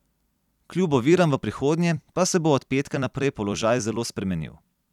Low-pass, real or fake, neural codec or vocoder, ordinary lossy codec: 19.8 kHz; fake; vocoder, 44.1 kHz, 128 mel bands every 256 samples, BigVGAN v2; none